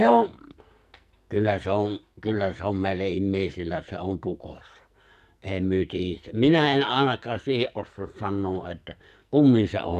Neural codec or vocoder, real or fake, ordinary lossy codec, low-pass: codec, 44.1 kHz, 2.6 kbps, SNAC; fake; none; 14.4 kHz